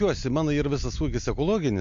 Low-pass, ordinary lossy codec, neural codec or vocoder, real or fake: 7.2 kHz; MP3, 48 kbps; none; real